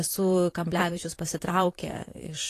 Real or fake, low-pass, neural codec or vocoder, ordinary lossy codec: fake; 14.4 kHz; vocoder, 44.1 kHz, 128 mel bands, Pupu-Vocoder; AAC, 48 kbps